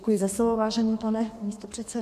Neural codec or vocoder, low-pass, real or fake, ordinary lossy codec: codec, 32 kHz, 1.9 kbps, SNAC; 14.4 kHz; fake; AAC, 96 kbps